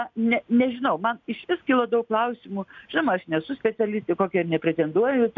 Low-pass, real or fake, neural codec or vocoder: 7.2 kHz; real; none